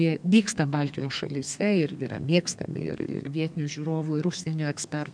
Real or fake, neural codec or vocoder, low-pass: fake; codec, 32 kHz, 1.9 kbps, SNAC; 9.9 kHz